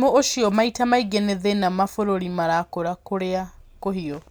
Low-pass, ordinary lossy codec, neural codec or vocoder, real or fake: none; none; none; real